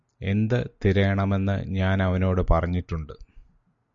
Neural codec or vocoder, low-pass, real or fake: none; 7.2 kHz; real